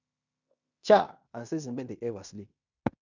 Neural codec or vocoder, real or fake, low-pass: codec, 16 kHz in and 24 kHz out, 0.9 kbps, LongCat-Audio-Codec, fine tuned four codebook decoder; fake; 7.2 kHz